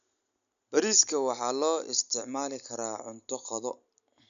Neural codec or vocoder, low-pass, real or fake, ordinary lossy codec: none; 7.2 kHz; real; none